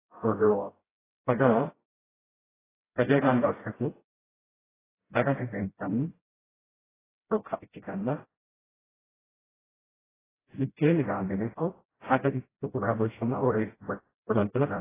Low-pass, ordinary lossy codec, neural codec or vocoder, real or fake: 3.6 kHz; AAC, 16 kbps; codec, 16 kHz, 0.5 kbps, FreqCodec, smaller model; fake